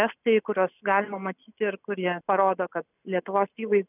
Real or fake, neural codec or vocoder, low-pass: real; none; 3.6 kHz